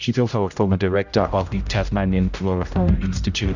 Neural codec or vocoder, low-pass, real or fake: codec, 16 kHz, 0.5 kbps, X-Codec, HuBERT features, trained on general audio; 7.2 kHz; fake